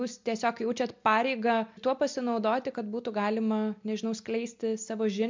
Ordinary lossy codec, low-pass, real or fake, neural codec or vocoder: MP3, 64 kbps; 7.2 kHz; real; none